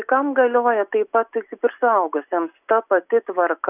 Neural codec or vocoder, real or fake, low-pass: none; real; 3.6 kHz